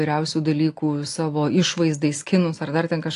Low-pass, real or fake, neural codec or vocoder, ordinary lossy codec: 10.8 kHz; real; none; AAC, 48 kbps